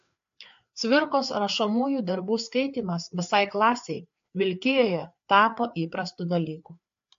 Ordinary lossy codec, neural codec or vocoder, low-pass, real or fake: AAC, 64 kbps; codec, 16 kHz, 4 kbps, FreqCodec, larger model; 7.2 kHz; fake